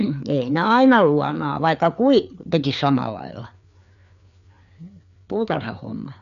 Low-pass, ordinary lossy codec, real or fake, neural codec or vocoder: 7.2 kHz; none; fake; codec, 16 kHz, 4 kbps, FreqCodec, larger model